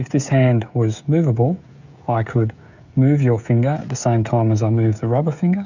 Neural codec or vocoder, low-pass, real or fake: codec, 16 kHz, 16 kbps, FreqCodec, smaller model; 7.2 kHz; fake